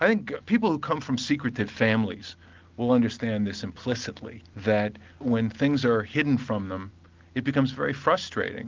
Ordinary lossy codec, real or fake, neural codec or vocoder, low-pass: Opus, 32 kbps; real; none; 7.2 kHz